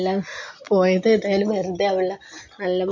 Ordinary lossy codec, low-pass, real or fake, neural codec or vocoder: MP3, 48 kbps; 7.2 kHz; real; none